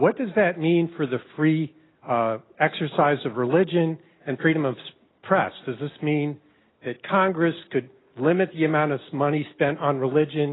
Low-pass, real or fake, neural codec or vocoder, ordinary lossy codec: 7.2 kHz; real; none; AAC, 16 kbps